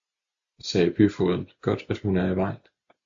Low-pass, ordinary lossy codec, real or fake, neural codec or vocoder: 7.2 kHz; AAC, 64 kbps; real; none